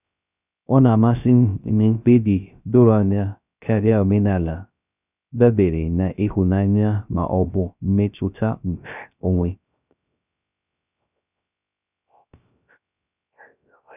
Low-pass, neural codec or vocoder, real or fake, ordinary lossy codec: 3.6 kHz; codec, 16 kHz, 0.3 kbps, FocalCodec; fake; none